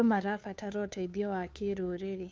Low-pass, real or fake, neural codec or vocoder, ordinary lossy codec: none; fake; codec, 16 kHz, 2 kbps, FunCodec, trained on Chinese and English, 25 frames a second; none